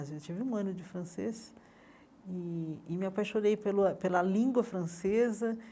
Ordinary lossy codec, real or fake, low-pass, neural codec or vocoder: none; real; none; none